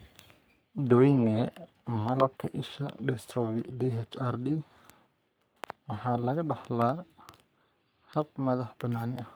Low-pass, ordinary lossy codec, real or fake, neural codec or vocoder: none; none; fake; codec, 44.1 kHz, 3.4 kbps, Pupu-Codec